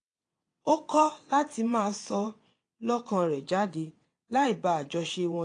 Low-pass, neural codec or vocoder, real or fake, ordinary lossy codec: 9.9 kHz; vocoder, 22.05 kHz, 80 mel bands, WaveNeXt; fake; AAC, 48 kbps